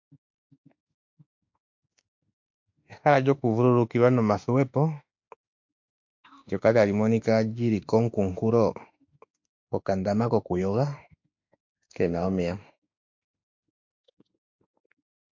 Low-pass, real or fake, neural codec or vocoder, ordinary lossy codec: 7.2 kHz; fake; autoencoder, 48 kHz, 32 numbers a frame, DAC-VAE, trained on Japanese speech; MP3, 48 kbps